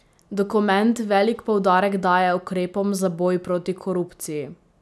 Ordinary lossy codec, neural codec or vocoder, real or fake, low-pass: none; none; real; none